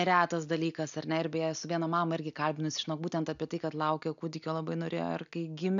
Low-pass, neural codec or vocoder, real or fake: 7.2 kHz; none; real